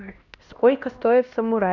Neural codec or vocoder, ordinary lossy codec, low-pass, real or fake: codec, 16 kHz, 1 kbps, X-Codec, HuBERT features, trained on LibriSpeech; none; 7.2 kHz; fake